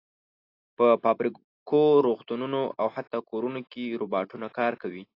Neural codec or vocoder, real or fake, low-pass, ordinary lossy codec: none; real; 5.4 kHz; AAC, 32 kbps